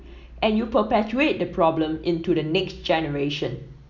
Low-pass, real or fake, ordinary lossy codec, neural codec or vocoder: 7.2 kHz; real; none; none